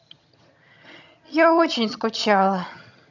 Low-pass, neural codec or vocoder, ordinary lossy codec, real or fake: 7.2 kHz; vocoder, 22.05 kHz, 80 mel bands, HiFi-GAN; none; fake